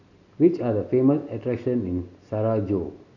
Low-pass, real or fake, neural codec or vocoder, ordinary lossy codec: 7.2 kHz; real; none; none